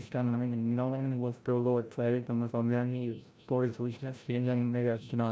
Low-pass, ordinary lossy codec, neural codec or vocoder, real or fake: none; none; codec, 16 kHz, 0.5 kbps, FreqCodec, larger model; fake